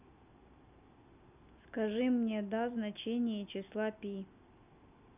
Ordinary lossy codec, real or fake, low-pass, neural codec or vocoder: none; real; 3.6 kHz; none